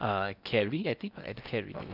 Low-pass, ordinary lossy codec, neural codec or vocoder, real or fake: 5.4 kHz; none; codec, 16 kHz in and 24 kHz out, 0.8 kbps, FocalCodec, streaming, 65536 codes; fake